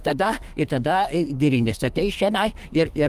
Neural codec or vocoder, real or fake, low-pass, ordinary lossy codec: codec, 32 kHz, 1.9 kbps, SNAC; fake; 14.4 kHz; Opus, 32 kbps